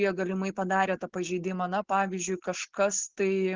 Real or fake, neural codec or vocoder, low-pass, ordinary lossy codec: real; none; 7.2 kHz; Opus, 16 kbps